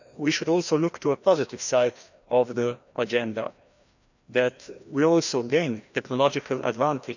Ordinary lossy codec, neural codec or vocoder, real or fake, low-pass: none; codec, 16 kHz, 1 kbps, FreqCodec, larger model; fake; 7.2 kHz